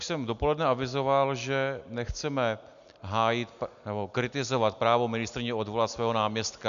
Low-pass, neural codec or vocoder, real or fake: 7.2 kHz; none; real